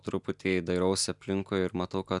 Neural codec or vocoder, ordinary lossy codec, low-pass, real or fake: none; MP3, 96 kbps; 10.8 kHz; real